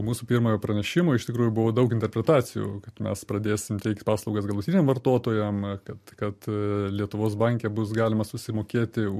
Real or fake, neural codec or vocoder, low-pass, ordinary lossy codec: real; none; 14.4 kHz; MP3, 64 kbps